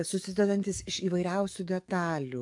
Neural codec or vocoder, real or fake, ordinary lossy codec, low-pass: codec, 44.1 kHz, 7.8 kbps, DAC; fake; AAC, 64 kbps; 10.8 kHz